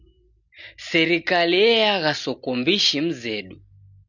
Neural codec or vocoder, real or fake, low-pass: none; real; 7.2 kHz